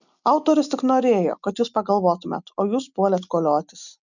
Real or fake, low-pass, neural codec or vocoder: real; 7.2 kHz; none